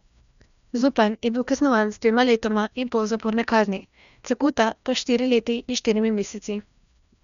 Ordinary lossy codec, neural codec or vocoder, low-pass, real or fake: none; codec, 16 kHz, 1 kbps, FreqCodec, larger model; 7.2 kHz; fake